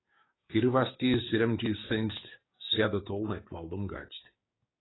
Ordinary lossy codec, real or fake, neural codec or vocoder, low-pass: AAC, 16 kbps; fake; codec, 16 kHz, 6 kbps, DAC; 7.2 kHz